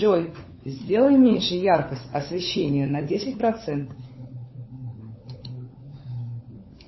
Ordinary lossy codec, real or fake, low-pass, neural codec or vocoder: MP3, 24 kbps; fake; 7.2 kHz; codec, 16 kHz, 4 kbps, FunCodec, trained on LibriTTS, 50 frames a second